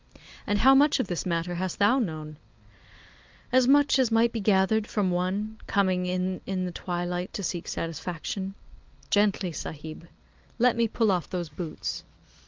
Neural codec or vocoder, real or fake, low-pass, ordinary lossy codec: none; real; 7.2 kHz; Opus, 32 kbps